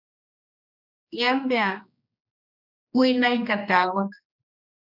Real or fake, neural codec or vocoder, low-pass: fake; codec, 16 kHz, 2 kbps, X-Codec, HuBERT features, trained on general audio; 5.4 kHz